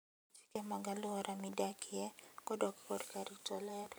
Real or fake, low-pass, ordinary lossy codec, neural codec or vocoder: fake; none; none; vocoder, 44.1 kHz, 128 mel bands every 512 samples, BigVGAN v2